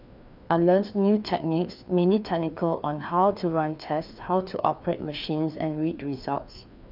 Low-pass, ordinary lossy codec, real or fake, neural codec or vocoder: 5.4 kHz; none; fake; codec, 16 kHz, 2 kbps, FreqCodec, larger model